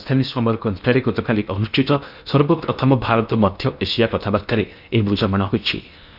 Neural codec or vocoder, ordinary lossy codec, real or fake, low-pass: codec, 16 kHz in and 24 kHz out, 0.8 kbps, FocalCodec, streaming, 65536 codes; none; fake; 5.4 kHz